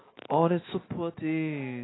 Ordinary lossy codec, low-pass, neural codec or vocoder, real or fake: AAC, 16 kbps; 7.2 kHz; none; real